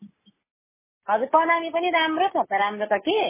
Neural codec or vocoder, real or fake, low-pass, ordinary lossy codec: none; real; 3.6 kHz; MP3, 16 kbps